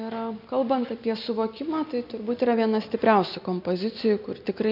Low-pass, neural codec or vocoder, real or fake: 5.4 kHz; none; real